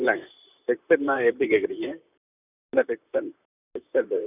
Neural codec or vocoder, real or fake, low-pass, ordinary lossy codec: vocoder, 44.1 kHz, 128 mel bands, Pupu-Vocoder; fake; 3.6 kHz; none